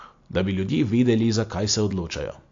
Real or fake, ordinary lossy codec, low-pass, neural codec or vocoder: real; AAC, 48 kbps; 7.2 kHz; none